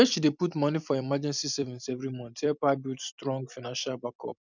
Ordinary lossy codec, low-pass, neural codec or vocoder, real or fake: none; 7.2 kHz; vocoder, 44.1 kHz, 128 mel bands every 256 samples, BigVGAN v2; fake